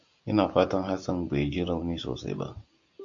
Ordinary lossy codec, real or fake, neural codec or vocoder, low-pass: AAC, 64 kbps; real; none; 7.2 kHz